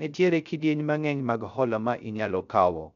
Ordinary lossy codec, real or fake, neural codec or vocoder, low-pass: none; fake; codec, 16 kHz, 0.3 kbps, FocalCodec; 7.2 kHz